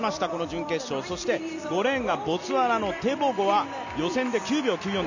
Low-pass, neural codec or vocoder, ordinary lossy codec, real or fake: 7.2 kHz; none; none; real